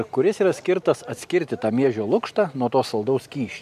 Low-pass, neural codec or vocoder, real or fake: 14.4 kHz; none; real